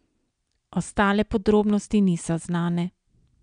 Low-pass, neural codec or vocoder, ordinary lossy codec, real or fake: 9.9 kHz; none; none; real